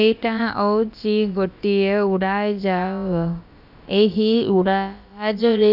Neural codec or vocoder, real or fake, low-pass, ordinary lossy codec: codec, 16 kHz, about 1 kbps, DyCAST, with the encoder's durations; fake; 5.4 kHz; none